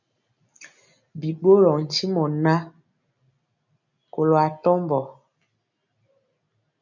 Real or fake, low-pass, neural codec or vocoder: real; 7.2 kHz; none